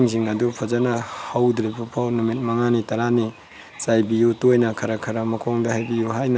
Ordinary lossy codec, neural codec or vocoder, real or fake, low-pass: none; none; real; none